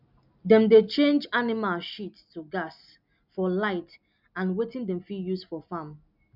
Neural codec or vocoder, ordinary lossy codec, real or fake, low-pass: none; none; real; 5.4 kHz